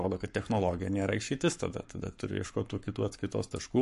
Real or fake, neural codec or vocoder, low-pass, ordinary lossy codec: fake; codec, 44.1 kHz, 7.8 kbps, Pupu-Codec; 14.4 kHz; MP3, 48 kbps